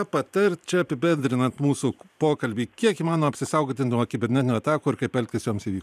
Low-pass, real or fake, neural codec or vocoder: 14.4 kHz; fake; vocoder, 44.1 kHz, 128 mel bands every 512 samples, BigVGAN v2